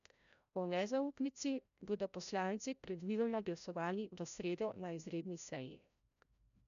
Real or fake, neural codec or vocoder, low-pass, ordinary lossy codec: fake; codec, 16 kHz, 0.5 kbps, FreqCodec, larger model; 7.2 kHz; none